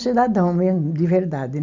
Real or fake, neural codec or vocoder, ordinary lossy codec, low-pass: real; none; none; 7.2 kHz